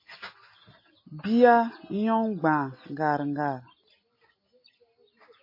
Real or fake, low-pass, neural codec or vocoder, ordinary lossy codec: real; 5.4 kHz; none; MP3, 24 kbps